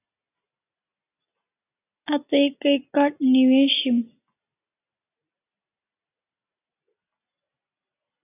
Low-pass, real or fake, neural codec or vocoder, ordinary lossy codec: 3.6 kHz; real; none; AAC, 24 kbps